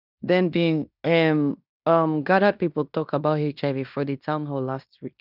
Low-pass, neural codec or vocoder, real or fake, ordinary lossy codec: 5.4 kHz; codec, 16 kHz in and 24 kHz out, 0.9 kbps, LongCat-Audio-Codec, fine tuned four codebook decoder; fake; none